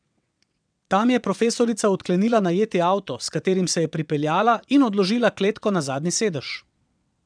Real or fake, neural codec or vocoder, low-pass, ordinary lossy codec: fake; vocoder, 22.05 kHz, 80 mel bands, WaveNeXt; 9.9 kHz; none